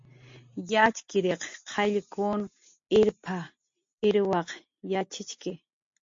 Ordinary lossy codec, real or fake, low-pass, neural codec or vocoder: MP3, 48 kbps; real; 7.2 kHz; none